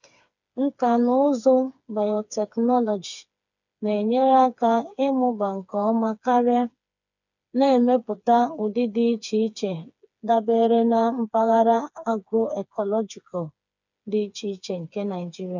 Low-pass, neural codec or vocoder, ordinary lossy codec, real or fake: 7.2 kHz; codec, 16 kHz, 4 kbps, FreqCodec, smaller model; none; fake